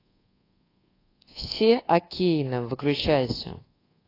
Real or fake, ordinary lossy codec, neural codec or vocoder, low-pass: fake; AAC, 24 kbps; codec, 24 kHz, 1.2 kbps, DualCodec; 5.4 kHz